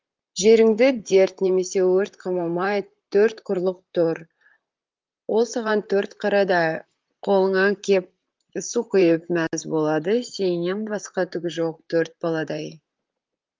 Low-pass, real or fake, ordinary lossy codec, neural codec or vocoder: 7.2 kHz; fake; Opus, 32 kbps; vocoder, 44.1 kHz, 128 mel bands, Pupu-Vocoder